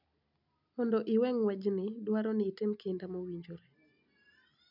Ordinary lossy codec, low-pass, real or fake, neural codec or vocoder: none; 5.4 kHz; real; none